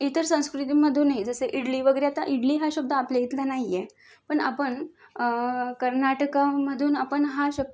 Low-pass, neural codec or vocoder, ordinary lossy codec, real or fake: none; none; none; real